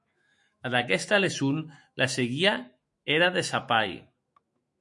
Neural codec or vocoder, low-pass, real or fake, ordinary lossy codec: autoencoder, 48 kHz, 128 numbers a frame, DAC-VAE, trained on Japanese speech; 10.8 kHz; fake; MP3, 48 kbps